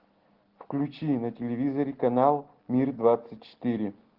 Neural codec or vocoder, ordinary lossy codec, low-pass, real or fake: none; Opus, 16 kbps; 5.4 kHz; real